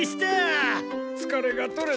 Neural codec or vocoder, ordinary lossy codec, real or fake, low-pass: none; none; real; none